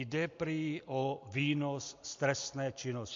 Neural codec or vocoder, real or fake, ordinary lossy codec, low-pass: none; real; MP3, 48 kbps; 7.2 kHz